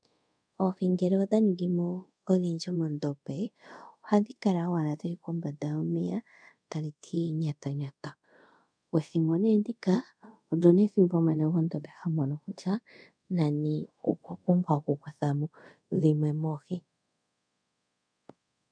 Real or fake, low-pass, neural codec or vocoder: fake; 9.9 kHz; codec, 24 kHz, 0.5 kbps, DualCodec